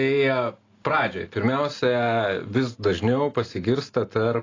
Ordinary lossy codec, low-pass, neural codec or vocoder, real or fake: AAC, 32 kbps; 7.2 kHz; none; real